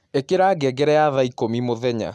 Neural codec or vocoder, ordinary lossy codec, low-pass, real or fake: none; none; none; real